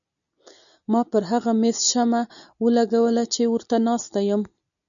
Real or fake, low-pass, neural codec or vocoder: real; 7.2 kHz; none